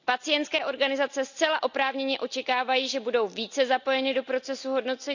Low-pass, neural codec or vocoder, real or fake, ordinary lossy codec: 7.2 kHz; none; real; none